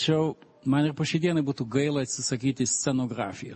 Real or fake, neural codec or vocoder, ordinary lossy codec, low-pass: real; none; MP3, 32 kbps; 10.8 kHz